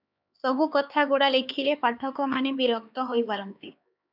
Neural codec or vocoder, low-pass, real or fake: codec, 16 kHz, 4 kbps, X-Codec, HuBERT features, trained on LibriSpeech; 5.4 kHz; fake